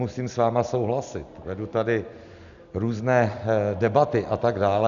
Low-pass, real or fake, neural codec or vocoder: 7.2 kHz; real; none